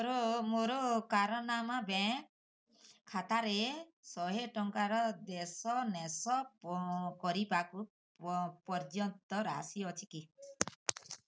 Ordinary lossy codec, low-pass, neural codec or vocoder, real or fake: none; none; none; real